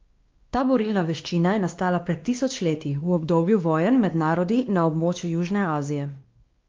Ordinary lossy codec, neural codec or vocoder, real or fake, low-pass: Opus, 32 kbps; codec, 16 kHz, 2 kbps, X-Codec, WavLM features, trained on Multilingual LibriSpeech; fake; 7.2 kHz